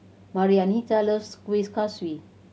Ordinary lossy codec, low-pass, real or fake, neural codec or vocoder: none; none; real; none